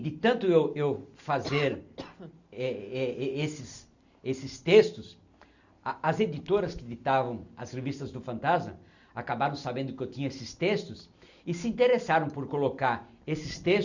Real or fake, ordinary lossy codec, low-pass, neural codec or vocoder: real; none; 7.2 kHz; none